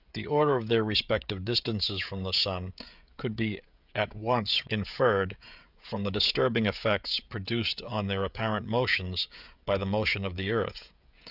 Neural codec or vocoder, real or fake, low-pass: codec, 16 kHz, 16 kbps, FreqCodec, smaller model; fake; 5.4 kHz